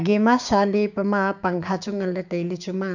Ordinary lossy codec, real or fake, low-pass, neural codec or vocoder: none; fake; 7.2 kHz; codec, 16 kHz, 6 kbps, DAC